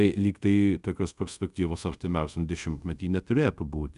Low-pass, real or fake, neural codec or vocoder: 10.8 kHz; fake; codec, 24 kHz, 0.5 kbps, DualCodec